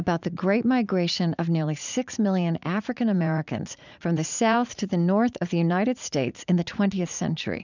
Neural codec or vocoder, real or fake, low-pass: vocoder, 44.1 kHz, 80 mel bands, Vocos; fake; 7.2 kHz